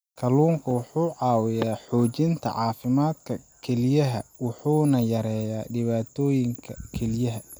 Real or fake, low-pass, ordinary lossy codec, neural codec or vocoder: real; none; none; none